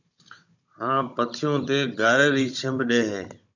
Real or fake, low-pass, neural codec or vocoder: fake; 7.2 kHz; codec, 16 kHz, 16 kbps, FunCodec, trained on Chinese and English, 50 frames a second